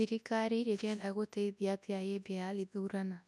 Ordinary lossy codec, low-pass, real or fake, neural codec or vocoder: none; none; fake; codec, 24 kHz, 0.9 kbps, WavTokenizer, large speech release